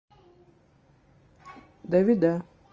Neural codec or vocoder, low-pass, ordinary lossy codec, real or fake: none; 7.2 kHz; Opus, 24 kbps; real